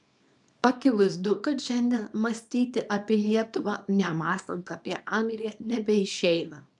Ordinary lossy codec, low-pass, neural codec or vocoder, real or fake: MP3, 96 kbps; 10.8 kHz; codec, 24 kHz, 0.9 kbps, WavTokenizer, small release; fake